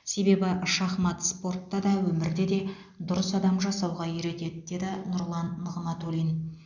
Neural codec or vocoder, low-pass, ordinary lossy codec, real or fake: none; 7.2 kHz; none; real